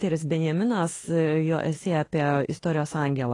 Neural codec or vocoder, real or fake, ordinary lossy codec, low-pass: autoencoder, 48 kHz, 32 numbers a frame, DAC-VAE, trained on Japanese speech; fake; AAC, 32 kbps; 10.8 kHz